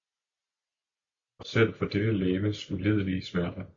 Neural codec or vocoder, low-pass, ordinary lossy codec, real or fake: none; 7.2 kHz; MP3, 48 kbps; real